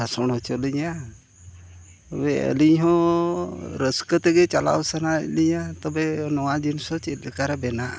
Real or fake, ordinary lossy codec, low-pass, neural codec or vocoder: real; none; none; none